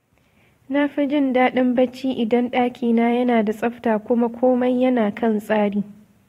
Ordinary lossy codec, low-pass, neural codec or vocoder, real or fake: AAC, 48 kbps; 19.8 kHz; vocoder, 44.1 kHz, 128 mel bands every 512 samples, BigVGAN v2; fake